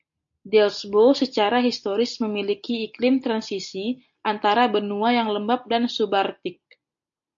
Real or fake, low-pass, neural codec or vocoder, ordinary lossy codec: real; 7.2 kHz; none; MP3, 48 kbps